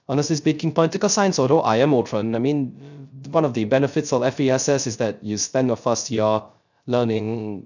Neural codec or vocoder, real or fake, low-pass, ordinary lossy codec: codec, 16 kHz, 0.3 kbps, FocalCodec; fake; 7.2 kHz; none